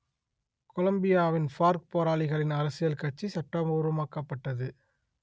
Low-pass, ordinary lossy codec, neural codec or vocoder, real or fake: none; none; none; real